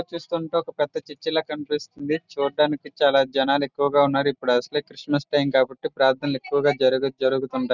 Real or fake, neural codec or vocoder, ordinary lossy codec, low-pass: real; none; none; 7.2 kHz